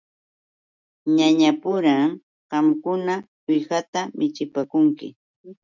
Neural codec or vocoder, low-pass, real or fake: none; 7.2 kHz; real